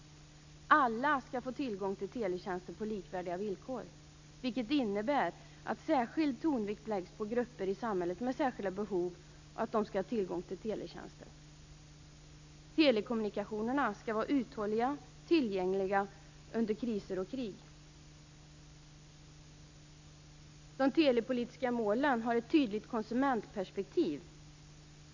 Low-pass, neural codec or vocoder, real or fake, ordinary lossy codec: 7.2 kHz; none; real; AAC, 48 kbps